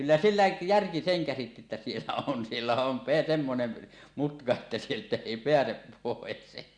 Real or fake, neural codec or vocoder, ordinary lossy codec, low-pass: real; none; Opus, 64 kbps; 9.9 kHz